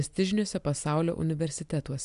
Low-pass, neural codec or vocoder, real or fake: 10.8 kHz; none; real